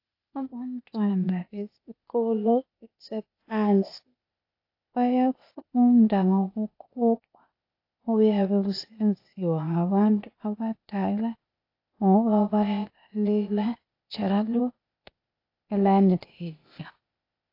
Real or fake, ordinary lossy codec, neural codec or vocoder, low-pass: fake; AAC, 32 kbps; codec, 16 kHz, 0.8 kbps, ZipCodec; 5.4 kHz